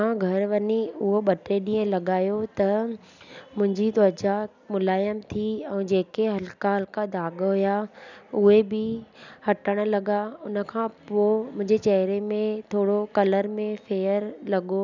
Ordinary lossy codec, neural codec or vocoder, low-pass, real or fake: none; none; 7.2 kHz; real